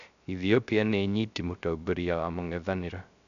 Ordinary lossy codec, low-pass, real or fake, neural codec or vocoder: none; 7.2 kHz; fake; codec, 16 kHz, 0.3 kbps, FocalCodec